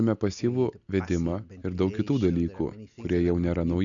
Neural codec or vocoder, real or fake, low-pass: none; real; 7.2 kHz